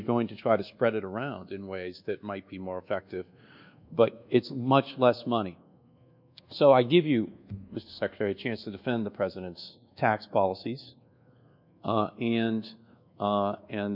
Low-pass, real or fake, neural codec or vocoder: 5.4 kHz; fake; codec, 24 kHz, 1.2 kbps, DualCodec